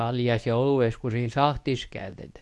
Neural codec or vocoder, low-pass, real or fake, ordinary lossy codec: codec, 24 kHz, 0.9 kbps, WavTokenizer, medium speech release version 2; none; fake; none